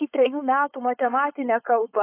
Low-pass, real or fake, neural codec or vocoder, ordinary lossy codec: 3.6 kHz; fake; codec, 16 kHz, 4.8 kbps, FACodec; AAC, 24 kbps